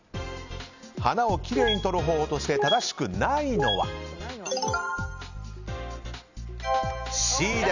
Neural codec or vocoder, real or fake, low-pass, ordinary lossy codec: none; real; 7.2 kHz; none